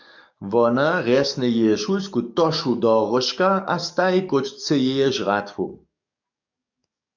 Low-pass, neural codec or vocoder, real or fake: 7.2 kHz; codec, 44.1 kHz, 7.8 kbps, DAC; fake